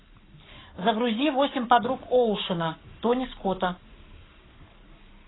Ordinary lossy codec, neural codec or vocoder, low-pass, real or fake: AAC, 16 kbps; codec, 44.1 kHz, 7.8 kbps, Pupu-Codec; 7.2 kHz; fake